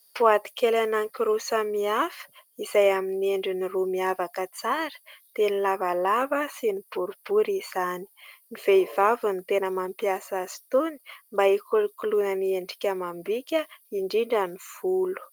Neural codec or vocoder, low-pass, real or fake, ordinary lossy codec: none; 19.8 kHz; real; Opus, 32 kbps